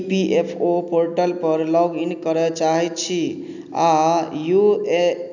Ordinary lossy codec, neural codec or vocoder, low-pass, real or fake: none; none; 7.2 kHz; real